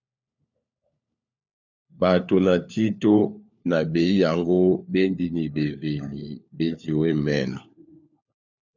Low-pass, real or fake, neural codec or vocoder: 7.2 kHz; fake; codec, 16 kHz, 4 kbps, FunCodec, trained on LibriTTS, 50 frames a second